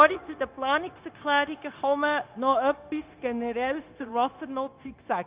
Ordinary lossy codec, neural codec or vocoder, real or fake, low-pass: Opus, 24 kbps; codec, 16 kHz, 0.9 kbps, LongCat-Audio-Codec; fake; 3.6 kHz